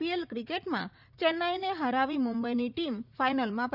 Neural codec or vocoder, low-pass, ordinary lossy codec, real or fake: vocoder, 44.1 kHz, 80 mel bands, Vocos; 5.4 kHz; none; fake